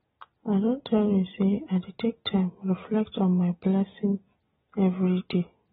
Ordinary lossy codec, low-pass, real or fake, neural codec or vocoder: AAC, 16 kbps; 9.9 kHz; real; none